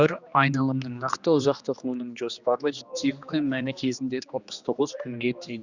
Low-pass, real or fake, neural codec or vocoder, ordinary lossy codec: 7.2 kHz; fake; codec, 16 kHz, 2 kbps, X-Codec, HuBERT features, trained on general audio; Opus, 64 kbps